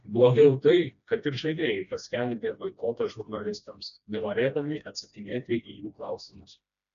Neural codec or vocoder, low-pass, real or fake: codec, 16 kHz, 1 kbps, FreqCodec, smaller model; 7.2 kHz; fake